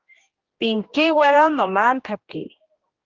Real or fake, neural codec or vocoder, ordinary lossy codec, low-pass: fake; codec, 16 kHz, 1 kbps, X-Codec, HuBERT features, trained on general audio; Opus, 16 kbps; 7.2 kHz